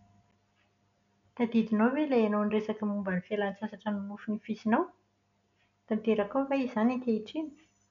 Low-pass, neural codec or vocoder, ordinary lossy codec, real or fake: 7.2 kHz; none; none; real